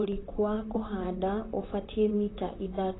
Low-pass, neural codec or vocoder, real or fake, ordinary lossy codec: 7.2 kHz; vocoder, 44.1 kHz, 128 mel bands, Pupu-Vocoder; fake; AAC, 16 kbps